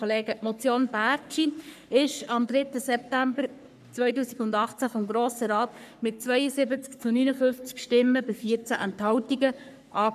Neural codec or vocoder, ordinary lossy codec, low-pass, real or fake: codec, 44.1 kHz, 3.4 kbps, Pupu-Codec; none; 14.4 kHz; fake